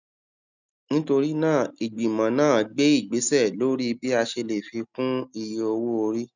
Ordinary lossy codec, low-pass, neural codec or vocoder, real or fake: none; 7.2 kHz; none; real